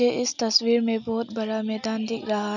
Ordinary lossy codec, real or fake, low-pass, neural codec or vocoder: none; real; 7.2 kHz; none